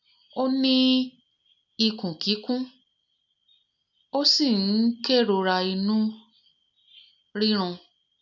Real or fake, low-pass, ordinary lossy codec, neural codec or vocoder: real; 7.2 kHz; none; none